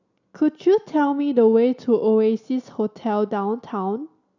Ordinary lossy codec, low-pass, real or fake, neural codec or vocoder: none; 7.2 kHz; real; none